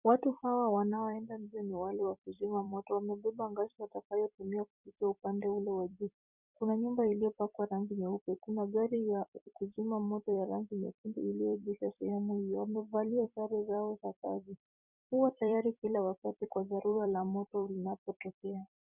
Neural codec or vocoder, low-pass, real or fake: vocoder, 44.1 kHz, 128 mel bands every 256 samples, BigVGAN v2; 3.6 kHz; fake